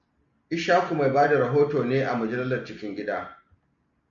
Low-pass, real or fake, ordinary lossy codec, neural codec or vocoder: 7.2 kHz; real; MP3, 64 kbps; none